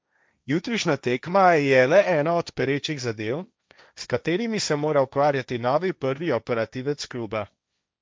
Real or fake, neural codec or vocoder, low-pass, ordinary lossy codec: fake; codec, 16 kHz, 1.1 kbps, Voila-Tokenizer; none; none